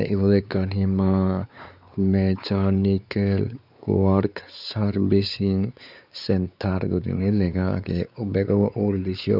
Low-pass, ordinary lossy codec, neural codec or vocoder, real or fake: 5.4 kHz; none; codec, 16 kHz, 4 kbps, X-Codec, WavLM features, trained on Multilingual LibriSpeech; fake